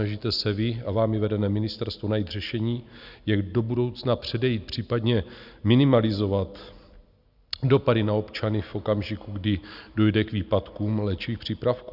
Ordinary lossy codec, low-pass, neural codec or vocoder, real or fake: Opus, 64 kbps; 5.4 kHz; none; real